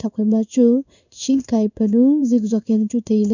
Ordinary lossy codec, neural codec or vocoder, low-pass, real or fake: none; codec, 16 kHz in and 24 kHz out, 1 kbps, XY-Tokenizer; 7.2 kHz; fake